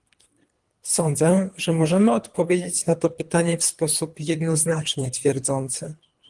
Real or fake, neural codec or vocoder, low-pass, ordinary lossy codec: fake; codec, 24 kHz, 3 kbps, HILCodec; 10.8 kHz; Opus, 24 kbps